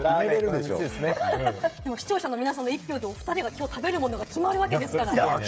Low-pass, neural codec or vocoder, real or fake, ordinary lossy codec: none; codec, 16 kHz, 16 kbps, FreqCodec, smaller model; fake; none